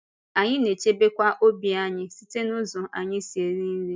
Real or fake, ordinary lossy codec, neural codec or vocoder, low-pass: real; none; none; none